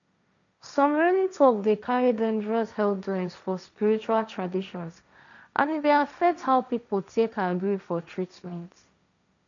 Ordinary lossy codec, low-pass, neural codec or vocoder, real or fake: none; 7.2 kHz; codec, 16 kHz, 1.1 kbps, Voila-Tokenizer; fake